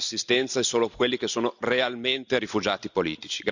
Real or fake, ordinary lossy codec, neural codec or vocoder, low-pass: real; none; none; 7.2 kHz